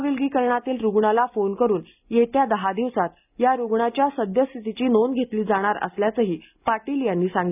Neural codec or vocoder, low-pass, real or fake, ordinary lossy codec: none; 3.6 kHz; real; none